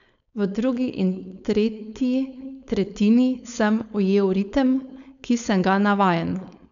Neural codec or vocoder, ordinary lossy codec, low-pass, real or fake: codec, 16 kHz, 4.8 kbps, FACodec; none; 7.2 kHz; fake